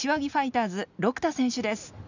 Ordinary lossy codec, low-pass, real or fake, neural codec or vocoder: none; 7.2 kHz; real; none